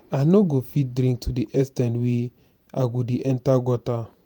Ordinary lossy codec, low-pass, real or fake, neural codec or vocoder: none; none; fake; vocoder, 48 kHz, 128 mel bands, Vocos